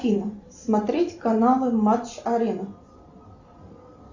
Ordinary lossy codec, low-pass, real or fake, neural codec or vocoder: Opus, 64 kbps; 7.2 kHz; fake; vocoder, 44.1 kHz, 128 mel bands every 256 samples, BigVGAN v2